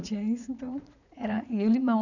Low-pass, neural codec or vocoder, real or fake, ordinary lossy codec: 7.2 kHz; vocoder, 44.1 kHz, 80 mel bands, Vocos; fake; none